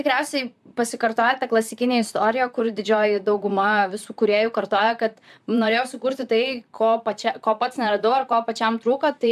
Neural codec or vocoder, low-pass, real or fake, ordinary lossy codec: vocoder, 44.1 kHz, 128 mel bands, Pupu-Vocoder; 14.4 kHz; fake; AAC, 96 kbps